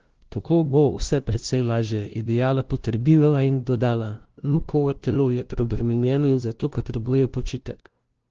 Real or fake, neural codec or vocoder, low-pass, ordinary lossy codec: fake; codec, 16 kHz, 0.5 kbps, FunCodec, trained on LibriTTS, 25 frames a second; 7.2 kHz; Opus, 16 kbps